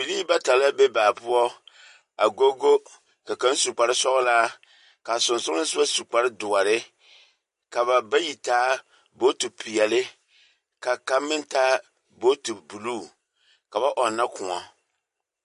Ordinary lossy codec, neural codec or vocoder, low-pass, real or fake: MP3, 48 kbps; none; 10.8 kHz; real